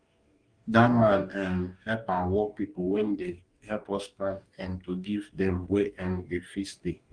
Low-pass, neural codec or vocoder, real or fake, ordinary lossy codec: 9.9 kHz; codec, 44.1 kHz, 2.6 kbps, DAC; fake; Opus, 32 kbps